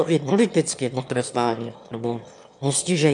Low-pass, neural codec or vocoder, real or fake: 9.9 kHz; autoencoder, 22.05 kHz, a latent of 192 numbers a frame, VITS, trained on one speaker; fake